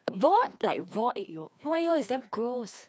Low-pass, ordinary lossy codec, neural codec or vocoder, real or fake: none; none; codec, 16 kHz, 2 kbps, FreqCodec, larger model; fake